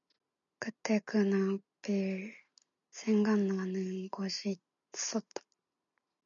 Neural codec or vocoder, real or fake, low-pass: none; real; 7.2 kHz